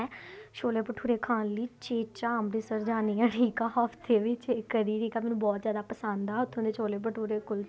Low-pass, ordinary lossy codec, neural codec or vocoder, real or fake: none; none; none; real